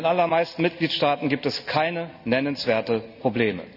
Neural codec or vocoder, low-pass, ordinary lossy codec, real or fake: none; 5.4 kHz; none; real